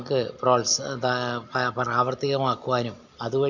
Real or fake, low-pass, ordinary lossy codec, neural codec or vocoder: real; 7.2 kHz; none; none